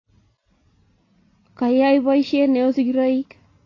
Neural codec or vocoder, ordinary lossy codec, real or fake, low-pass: none; AAC, 32 kbps; real; 7.2 kHz